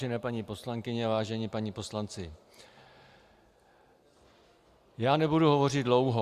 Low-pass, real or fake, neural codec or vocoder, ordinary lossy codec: 14.4 kHz; real; none; Opus, 64 kbps